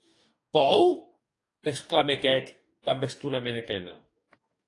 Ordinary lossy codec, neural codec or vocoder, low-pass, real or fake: AAC, 48 kbps; codec, 44.1 kHz, 2.6 kbps, DAC; 10.8 kHz; fake